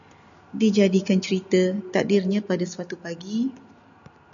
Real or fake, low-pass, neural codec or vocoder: real; 7.2 kHz; none